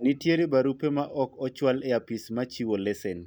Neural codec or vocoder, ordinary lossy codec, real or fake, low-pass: none; none; real; none